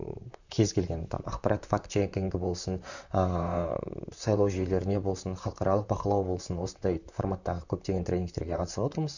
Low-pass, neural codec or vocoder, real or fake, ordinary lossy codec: 7.2 kHz; vocoder, 44.1 kHz, 128 mel bands, Pupu-Vocoder; fake; none